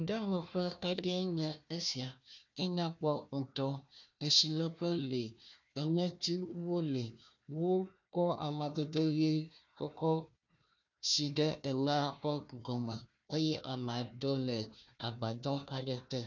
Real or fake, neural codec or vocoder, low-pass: fake; codec, 16 kHz, 1 kbps, FunCodec, trained on Chinese and English, 50 frames a second; 7.2 kHz